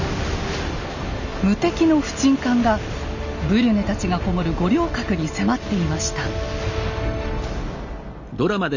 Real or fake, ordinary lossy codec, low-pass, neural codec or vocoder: real; none; 7.2 kHz; none